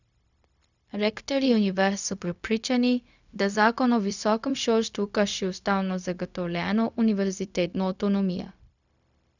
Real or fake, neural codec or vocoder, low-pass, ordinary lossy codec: fake; codec, 16 kHz, 0.4 kbps, LongCat-Audio-Codec; 7.2 kHz; none